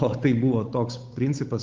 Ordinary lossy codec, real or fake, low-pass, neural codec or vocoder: Opus, 32 kbps; fake; 7.2 kHz; codec, 16 kHz, 8 kbps, FunCodec, trained on Chinese and English, 25 frames a second